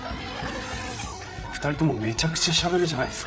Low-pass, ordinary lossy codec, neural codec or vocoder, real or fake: none; none; codec, 16 kHz, 8 kbps, FreqCodec, larger model; fake